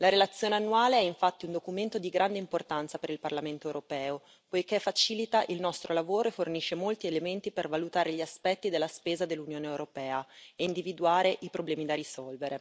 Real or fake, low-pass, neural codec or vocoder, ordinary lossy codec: real; none; none; none